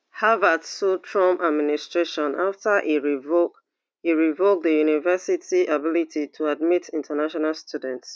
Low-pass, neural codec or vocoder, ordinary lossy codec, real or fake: none; none; none; real